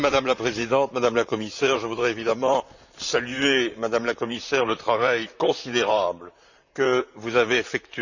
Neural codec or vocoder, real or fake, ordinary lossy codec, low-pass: vocoder, 44.1 kHz, 128 mel bands, Pupu-Vocoder; fake; none; 7.2 kHz